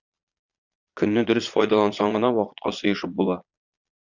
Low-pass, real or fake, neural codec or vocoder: 7.2 kHz; fake; vocoder, 22.05 kHz, 80 mel bands, Vocos